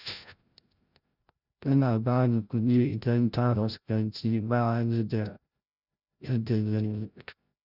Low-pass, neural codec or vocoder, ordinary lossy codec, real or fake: 5.4 kHz; codec, 16 kHz, 0.5 kbps, FreqCodec, larger model; none; fake